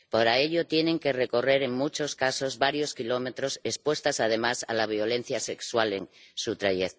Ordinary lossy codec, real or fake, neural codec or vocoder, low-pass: none; real; none; none